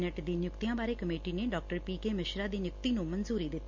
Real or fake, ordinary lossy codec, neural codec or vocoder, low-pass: real; MP3, 64 kbps; none; 7.2 kHz